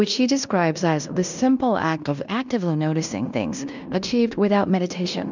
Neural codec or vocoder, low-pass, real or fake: codec, 16 kHz in and 24 kHz out, 0.9 kbps, LongCat-Audio-Codec, fine tuned four codebook decoder; 7.2 kHz; fake